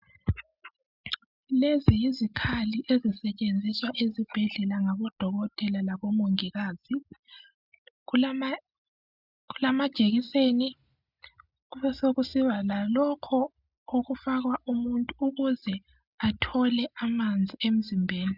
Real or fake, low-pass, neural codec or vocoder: real; 5.4 kHz; none